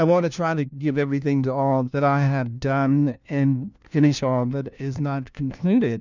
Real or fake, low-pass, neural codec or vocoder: fake; 7.2 kHz; codec, 16 kHz, 1 kbps, FunCodec, trained on LibriTTS, 50 frames a second